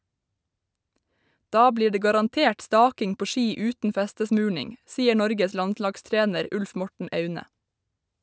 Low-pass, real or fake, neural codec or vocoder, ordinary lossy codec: none; real; none; none